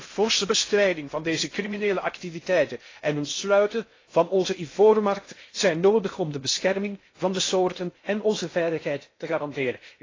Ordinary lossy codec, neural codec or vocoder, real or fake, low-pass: AAC, 32 kbps; codec, 16 kHz in and 24 kHz out, 0.6 kbps, FocalCodec, streaming, 2048 codes; fake; 7.2 kHz